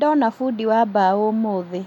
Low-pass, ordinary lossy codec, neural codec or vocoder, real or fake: 19.8 kHz; none; none; real